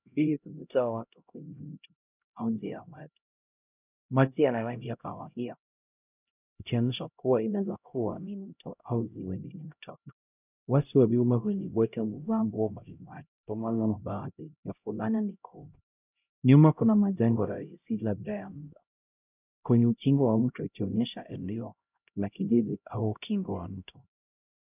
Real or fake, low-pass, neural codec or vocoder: fake; 3.6 kHz; codec, 16 kHz, 0.5 kbps, X-Codec, HuBERT features, trained on LibriSpeech